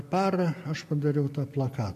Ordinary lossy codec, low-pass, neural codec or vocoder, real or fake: AAC, 64 kbps; 14.4 kHz; none; real